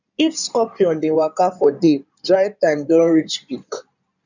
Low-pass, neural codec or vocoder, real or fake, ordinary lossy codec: 7.2 kHz; codec, 16 kHz in and 24 kHz out, 2.2 kbps, FireRedTTS-2 codec; fake; none